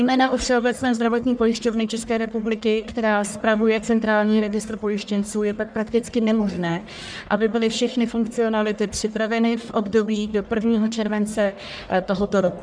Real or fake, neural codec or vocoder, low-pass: fake; codec, 44.1 kHz, 1.7 kbps, Pupu-Codec; 9.9 kHz